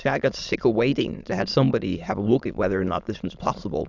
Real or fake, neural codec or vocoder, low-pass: fake; autoencoder, 22.05 kHz, a latent of 192 numbers a frame, VITS, trained on many speakers; 7.2 kHz